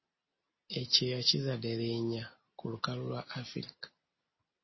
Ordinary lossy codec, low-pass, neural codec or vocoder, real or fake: MP3, 24 kbps; 7.2 kHz; none; real